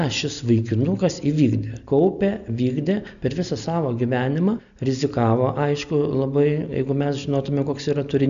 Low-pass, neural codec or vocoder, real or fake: 7.2 kHz; none; real